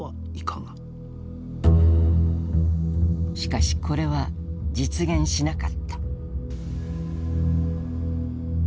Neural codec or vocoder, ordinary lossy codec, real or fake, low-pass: none; none; real; none